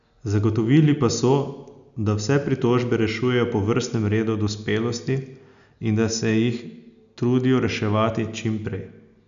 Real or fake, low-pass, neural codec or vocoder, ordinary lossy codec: real; 7.2 kHz; none; none